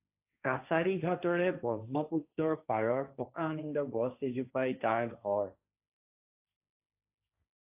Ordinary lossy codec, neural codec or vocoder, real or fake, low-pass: AAC, 32 kbps; codec, 16 kHz, 1.1 kbps, Voila-Tokenizer; fake; 3.6 kHz